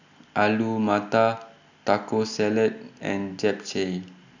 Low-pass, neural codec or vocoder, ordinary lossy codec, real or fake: 7.2 kHz; none; none; real